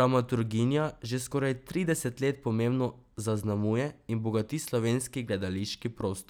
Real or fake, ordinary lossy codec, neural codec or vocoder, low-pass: real; none; none; none